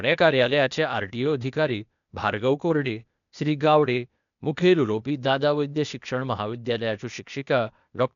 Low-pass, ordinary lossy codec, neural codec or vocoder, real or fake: 7.2 kHz; none; codec, 16 kHz, 0.8 kbps, ZipCodec; fake